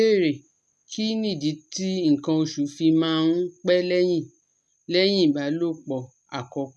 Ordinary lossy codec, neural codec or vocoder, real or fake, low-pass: none; none; real; none